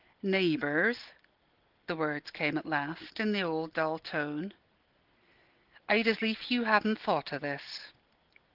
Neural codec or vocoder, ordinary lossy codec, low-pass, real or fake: none; Opus, 16 kbps; 5.4 kHz; real